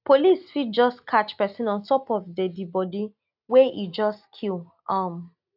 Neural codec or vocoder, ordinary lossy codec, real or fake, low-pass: none; AAC, 48 kbps; real; 5.4 kHz